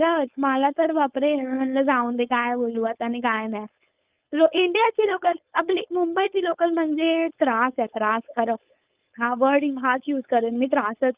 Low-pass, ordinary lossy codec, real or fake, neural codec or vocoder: 3.6 kHz; Opus, 24 kbps; fake; codec, 16 kHz, 4.8 kbps, FACodec